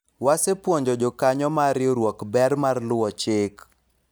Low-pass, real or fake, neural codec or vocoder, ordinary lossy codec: none; real; none; none